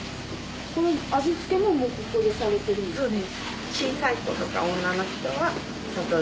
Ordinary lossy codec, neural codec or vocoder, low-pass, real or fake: none; none; none; real